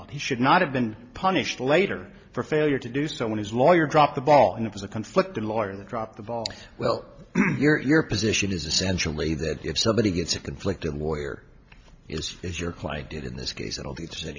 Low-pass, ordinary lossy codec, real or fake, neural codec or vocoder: 7.2 kHz; MP3, 48 kbps; real; none